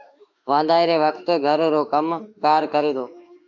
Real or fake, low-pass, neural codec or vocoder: fake; 7.2 kHz; autoencoder, 48 kHz, 32 numbers a frame, DAC-VAE, trained on Japanese speech